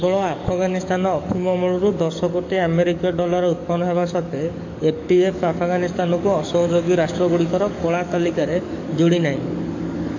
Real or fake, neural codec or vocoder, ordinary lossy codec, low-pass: fake; codec, 44.1 kHz, 7.8 kbps, DAC; AAC, 48 kbps; 7.2 kHz